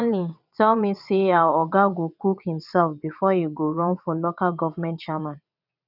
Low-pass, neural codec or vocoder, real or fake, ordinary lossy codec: 5.4 kHz; vocoder, 22.05 kHz, 80 mel bands, WaveNeXt; fake; none